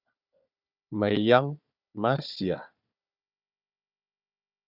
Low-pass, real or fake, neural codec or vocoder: 5.4 kHz; fake; codec, 16 kHz, 4 kbps, FunCodec, trained on Chinese and English, 50 frames a second